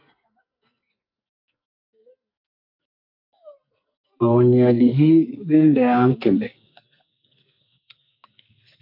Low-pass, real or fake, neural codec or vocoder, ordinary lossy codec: 5.4 kHz; fake; codec, 44.1 kHz, 2.6 kbps, SNAC; MP3, 48 kbps